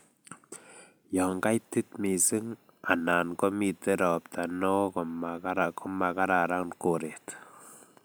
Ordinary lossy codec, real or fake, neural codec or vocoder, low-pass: none; real; none; none